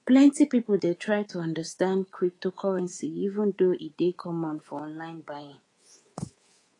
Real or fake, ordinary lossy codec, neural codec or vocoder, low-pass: fake; AAC, 32 kbps; codec, 24 kHz, 3.1 kbps, DualCodec; 10.8 kHz